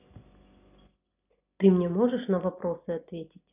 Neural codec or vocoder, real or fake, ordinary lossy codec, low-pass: none; real; none; 3.6 kHz